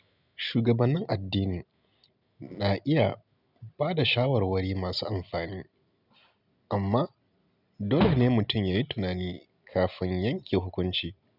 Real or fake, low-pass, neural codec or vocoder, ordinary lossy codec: real; 5.4 kHz; none; none